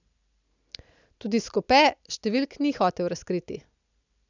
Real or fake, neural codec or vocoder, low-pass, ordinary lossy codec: real; none; 7.2 kHz; none